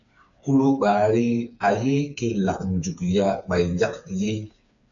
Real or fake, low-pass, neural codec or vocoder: fake; 7.2 kHz; codec, 16 kHz, 4 kbps, FreqCodec, smaller model